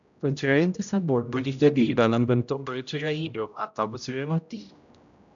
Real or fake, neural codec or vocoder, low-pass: fake; codec, 16 kHz, 0.5 kbps, X-Codec, HuBERT features, trained on general audio; 7.2 kHz